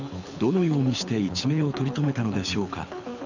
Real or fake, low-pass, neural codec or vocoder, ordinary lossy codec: fake; 7.2 kHz; codec, 24 kHz, 6 kbps, HILCodec; none